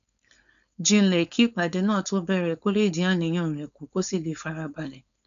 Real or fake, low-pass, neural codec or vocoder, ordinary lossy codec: fake; 7.2 kHz; codec, 16 kHz, 4.8 kbps, FACodec; none